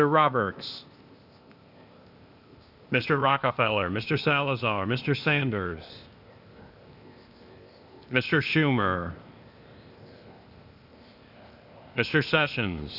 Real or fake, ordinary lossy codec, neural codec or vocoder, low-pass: fake; Opus, 64 kbps; codec, 16 kHz, 0.8 kbps, ZipCodec; 5.4 kHz